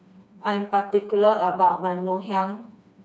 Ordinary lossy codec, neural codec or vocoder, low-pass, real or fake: none; codec, 16 kHz, 2 kbps, FreqCodec, smaller model; none; fake